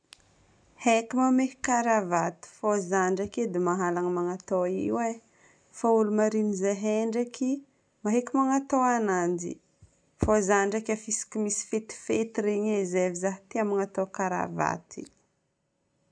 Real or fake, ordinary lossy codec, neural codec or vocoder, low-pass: real; none; none; 9.9 kHz